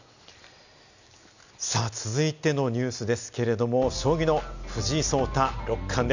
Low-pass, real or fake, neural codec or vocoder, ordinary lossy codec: 7.2 kHz; real; none; none